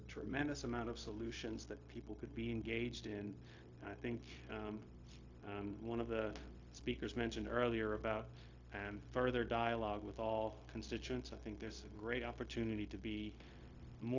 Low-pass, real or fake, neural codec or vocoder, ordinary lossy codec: 7.2 kHz; fake; codec, 16 kHz, 0.4 kbps, LongCat-Audio-Codec; AAC, 48 kbps